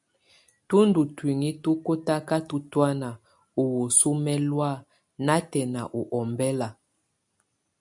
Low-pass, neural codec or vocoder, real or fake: 10.8 kHz; none; real